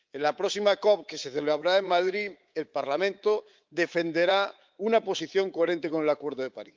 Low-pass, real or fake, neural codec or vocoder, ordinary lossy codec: 7.2 kHz; fake; codec, 24 kHz, 3.1 kbps, DualCodec; Opus, 32 kbps